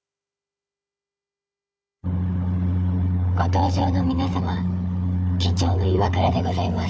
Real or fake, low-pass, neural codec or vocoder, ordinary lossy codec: fake; none; codec, 16 kHz, 4 kbps, FunCodec, trained on Chinese and English, 50 frames a second; none